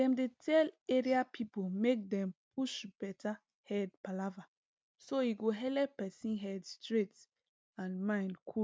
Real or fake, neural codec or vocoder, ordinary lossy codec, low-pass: real; none; none; none